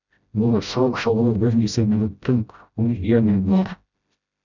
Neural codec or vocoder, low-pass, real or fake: codec, 16 kHz, 0.5 kbps, FreqCodec, smaller model; 7.2 kHz; fake